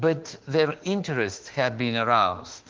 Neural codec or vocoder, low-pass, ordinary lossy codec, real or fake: autoencoder, 48 kHz, 32 numbers a frame, DAC-VAE, trained on Japanese speech; 7.2 kHz; Opus, 16 kbps; fake